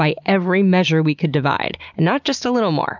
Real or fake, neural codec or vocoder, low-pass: real; none; 7.2 kHz